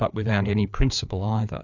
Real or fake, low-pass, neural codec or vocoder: fake; 7.2 kHz; codec, 16 kHz, 4 kbps, FreqCodec, larger model